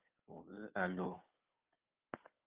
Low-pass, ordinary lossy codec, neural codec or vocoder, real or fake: 3.6 kHz; Opus, 32 kbps; codec, 16 kHz, 2 kbps, FunCodec, trained on Chinese and English, 25 frames a second; fake